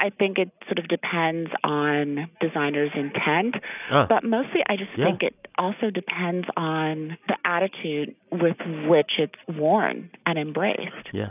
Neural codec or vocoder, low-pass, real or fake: none; 3.6 kHz; real